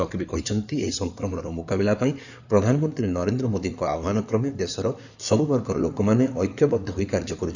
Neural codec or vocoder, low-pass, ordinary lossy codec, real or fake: codec, 16 kHz in and 24 kHz out, 2.2 kbps, FireRedTTS-2 codec; 7.2 kHz; none; fake